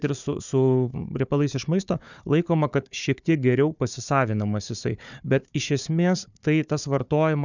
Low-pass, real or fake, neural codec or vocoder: 7.2 kHz; fake; codec, 16 kHz, 4 kbps, FunCodec, trained on Chinese and English, 50 frames a second